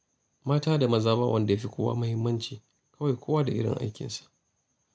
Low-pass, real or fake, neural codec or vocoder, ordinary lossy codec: none; real; none; none